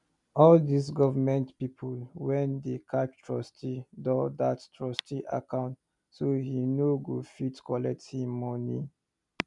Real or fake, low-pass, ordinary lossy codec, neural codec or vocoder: real; 10.8 kHz; none; none